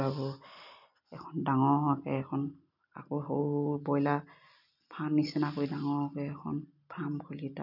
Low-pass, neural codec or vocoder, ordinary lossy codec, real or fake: 5.4 kHz; none; none; real